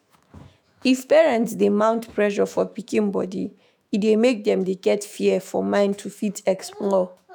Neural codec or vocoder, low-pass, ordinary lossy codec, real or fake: autoencoder, 48 kHz, 128 numbers a frame, DAC-VAE, trained on Japanese speech; none; none; fake